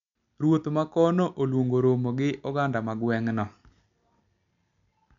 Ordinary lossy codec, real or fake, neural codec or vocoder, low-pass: none; real; none; 7.2 kHz